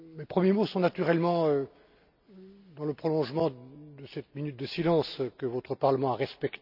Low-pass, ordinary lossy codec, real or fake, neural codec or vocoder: 5.4 kHz; none; real; none